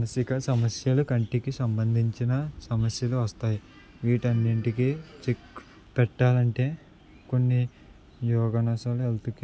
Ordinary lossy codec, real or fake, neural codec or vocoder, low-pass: none; real; none; none